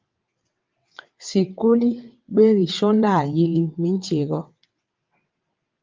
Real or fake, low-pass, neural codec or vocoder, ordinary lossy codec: fake; 7.2 kHz; vocoder, 22.05 kHz, 80 mel bands, WaveNeXt; Opus, 32 kbps